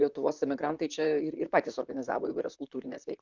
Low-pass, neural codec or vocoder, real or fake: 7.2 kHz; vocoder, 44.1 kHz, 128 mel bands, Pupu-Vocoder; fake